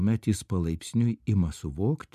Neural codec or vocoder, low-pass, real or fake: none; 14.4 kHz; real